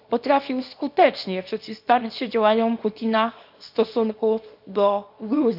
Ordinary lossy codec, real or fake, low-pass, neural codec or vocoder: none; fake; 5.4 kHz; codec, 24 kHz, 0.9 kbps, WavTokenizer, small release